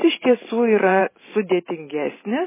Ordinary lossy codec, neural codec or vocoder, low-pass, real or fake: MP3, 16 kbps; vocoder, 44.1 kHz, 128 mel bands every 512 samples, BigVGAN v2; 3.6 kHz; fake